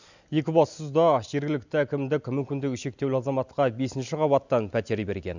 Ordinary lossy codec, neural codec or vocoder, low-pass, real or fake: none; none; 7.2 kHz; real